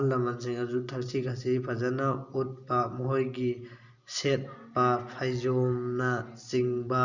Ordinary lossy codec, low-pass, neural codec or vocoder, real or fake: Opus, 64 kbps; 7.2 kHz; none; real